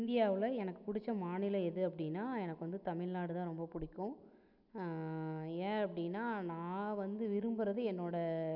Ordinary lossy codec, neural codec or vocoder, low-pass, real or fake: none; none; 5.4 kHz; real